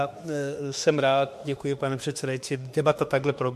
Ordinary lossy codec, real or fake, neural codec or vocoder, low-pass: MP3, 64 kbps; fake; autoencoder, 48 kHz, 32 numbers a frame, DAC-VAE, trained on Japanese speech; 14.4 kHz